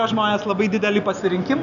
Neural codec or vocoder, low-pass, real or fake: none; 7.2 kHz; real